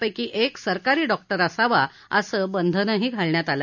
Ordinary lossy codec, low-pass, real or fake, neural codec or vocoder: none; 7.2 kHz; real; none